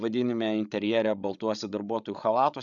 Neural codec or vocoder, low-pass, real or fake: codec, 16 kHz, 16 kbps, FreqCodec, larger model; 7.2 kHz; fake